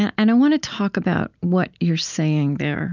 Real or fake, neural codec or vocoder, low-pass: real; none; 7.2 kHz